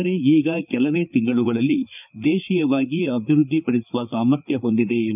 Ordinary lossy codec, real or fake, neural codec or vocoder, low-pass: none; fake; codec, 16 kHz, 4 kbps, FreqCodec, larger model; 3.6 kHz